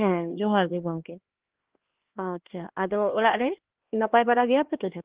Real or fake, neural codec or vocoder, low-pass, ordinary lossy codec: fake; codec, 16 kHz, 2 kbps, X-Codec, HuBERT features, trained on LibriSpeech; 3.6 kHz; Opus, 16 kbps